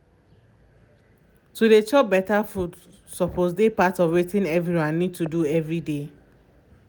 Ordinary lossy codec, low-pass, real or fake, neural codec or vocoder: none; none; real; none